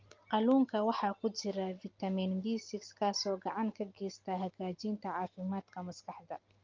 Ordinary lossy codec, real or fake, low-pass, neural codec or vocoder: Opus, 24 kbps; real; 7.2 kHz; none